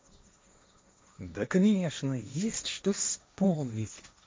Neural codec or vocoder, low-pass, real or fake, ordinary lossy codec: codec, 16 kHz, 1.1 kbps, Voila-Tokenizer; none; fake; none